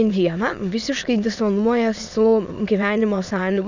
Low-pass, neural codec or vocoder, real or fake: 7.2 kHz; autoencoder, 22.05 kHz, a latent of 192 numbers a frame, VITS, trained on many speakers; fake